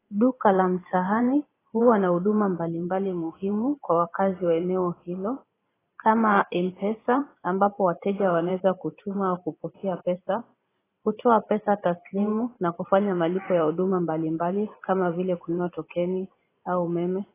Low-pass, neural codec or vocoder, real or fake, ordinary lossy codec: 3.6 kHz; vocoder, 44.1 kHz, 128 mel bands every 512 samples, BigVGAN v2; fake; AAC, 16 kbps